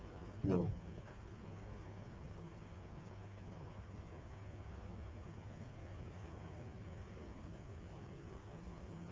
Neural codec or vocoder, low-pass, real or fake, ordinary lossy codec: codec, 16 kHz, 4 kbps, FreqCodec, smaller model; none; fake; none